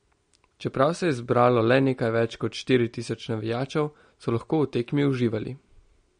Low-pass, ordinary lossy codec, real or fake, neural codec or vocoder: 9.9 kHz; MP3, 48 kbps; real; none